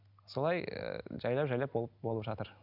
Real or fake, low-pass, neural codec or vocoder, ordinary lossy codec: real; 5.4 kHz; none; none